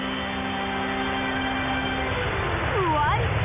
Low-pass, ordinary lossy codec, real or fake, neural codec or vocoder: 3.6 kHz; none; real; none